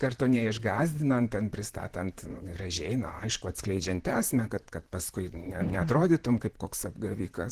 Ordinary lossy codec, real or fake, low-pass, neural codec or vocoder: Opus, 16 kbps; fake; 14.4 kHz; vocoder, 44.1 kHz, 128 mel bands, Pupu-Vocoder